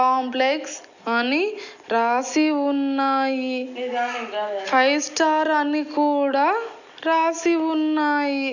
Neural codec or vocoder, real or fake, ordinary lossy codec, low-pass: none; real; none; 7.2 kHz